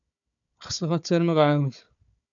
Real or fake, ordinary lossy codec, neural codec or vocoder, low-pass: fake; MP3, 96 kbps; codec, 16 kHz, 4 kbps, FunCodec, trained on Chinese and English, 50 frames a second; 7.2 kHz